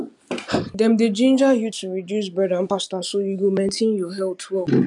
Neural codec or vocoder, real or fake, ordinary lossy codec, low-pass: none; real; none; 10.8 kHz